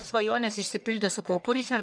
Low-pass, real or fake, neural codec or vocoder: 9.9 kHz; fake; codec, 44.1 kHz, 1.7 kbps, Pupu-Codec